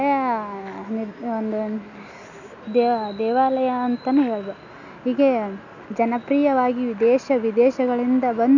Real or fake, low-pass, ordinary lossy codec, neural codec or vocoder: real; 7.2 kHz; none; none